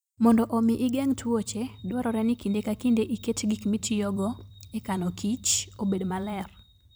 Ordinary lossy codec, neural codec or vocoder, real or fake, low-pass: none; vocoder, 44.1 kHz, 128 mel bands every 256 samples, BigVGAN v2; fake; none